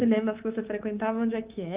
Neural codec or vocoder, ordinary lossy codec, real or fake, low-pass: codec, 24 kHz, 3.1 kbps, DualCodec; Opus, 32 kbps; fake; 3.6 kHz